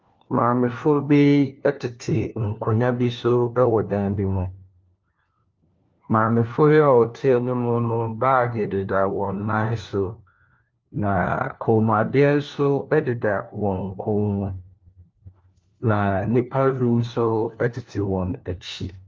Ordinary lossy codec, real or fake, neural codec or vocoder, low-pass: Opus, 32 kbps; fake; codec, 16 kHz, 1 kbps, FunCodec, trained on LibriTTS, 50 frames a second; 7.2 kHz